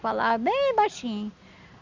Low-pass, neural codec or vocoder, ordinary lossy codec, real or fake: 7.2 kHz; none; Opus, 64 kbps; real